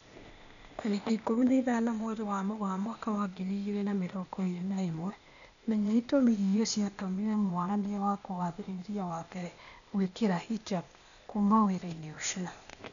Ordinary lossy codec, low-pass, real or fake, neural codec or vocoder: none; 7.2 kHz; fake; codec, 16 kHz, 0.8 kbps, ZipCodec